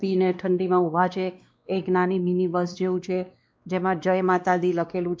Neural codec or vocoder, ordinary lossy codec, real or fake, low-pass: codec, 16 kHz, 2 kbps, X-Codec, WavLM features, trained on Multilingual LibriSpeech; none; fake; none